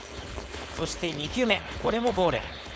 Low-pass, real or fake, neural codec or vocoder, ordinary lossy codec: none; fake; codec, 16 kHz, 4.8 kbps, FACodec; none